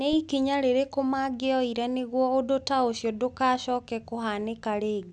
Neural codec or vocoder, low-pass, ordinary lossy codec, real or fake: none; none; none; real